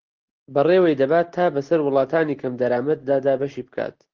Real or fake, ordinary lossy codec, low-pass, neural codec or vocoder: real; Opus, 16 kbps; 7.2 kHz; none